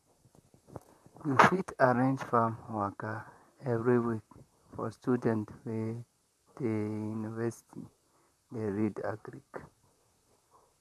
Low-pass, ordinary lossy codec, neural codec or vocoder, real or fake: 14.4 kHz; none; vocoder, 44.1 kHz, 128 mel bands, Pupu-Vocoder; fake